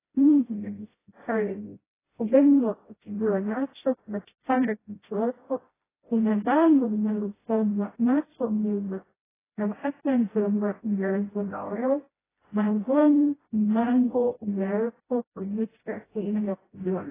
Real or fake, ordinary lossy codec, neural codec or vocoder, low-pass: fake; AAC, 16 kbps; codec, 16 kHz, 0.5 kbps, FreqCodec, smaller model; 3.6 kHz